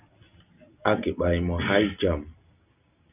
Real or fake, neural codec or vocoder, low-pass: real; none; 3.6 kHz